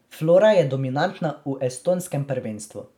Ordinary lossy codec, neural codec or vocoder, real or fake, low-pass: none; none; real; 19.8 kHz